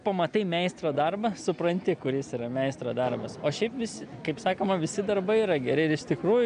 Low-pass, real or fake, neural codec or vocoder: 9.9 kHz; real; none